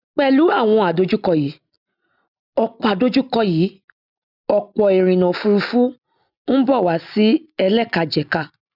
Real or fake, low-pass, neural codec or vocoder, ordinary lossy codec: real; 5.4 kHz; none; none